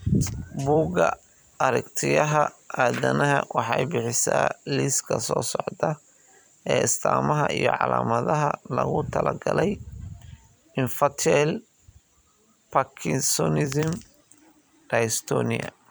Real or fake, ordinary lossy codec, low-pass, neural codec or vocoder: fake; none; none; vocoder, 44.1 kHz, 128 mel bands every 256 samples, BigVGAN v2